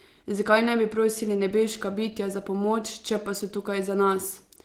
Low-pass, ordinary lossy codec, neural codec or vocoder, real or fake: 19.8 kHz; Opus, 16 kbps; none; real